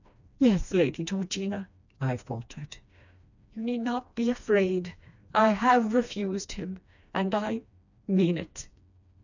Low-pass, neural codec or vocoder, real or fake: 7.2 kHz; codec, 16 kHz, 2 kbps, FreqCodec, smaller model; fake